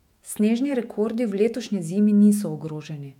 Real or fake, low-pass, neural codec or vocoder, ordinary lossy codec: fake; 19.8 kHz; autoencoder, 48 kHz, 128 numbers a frame, DAC-VAE, trained on Japanese speech; MP3, 96 kbps